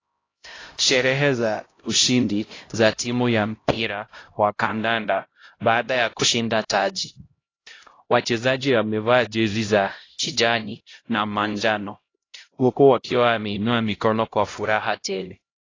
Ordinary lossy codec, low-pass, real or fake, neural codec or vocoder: AAC, 32 kbps; 7.2 kHz; fake; codec, 16 kHz, 0.5 kbps, X-Codec, HuBERT features, trained on LibriSpeech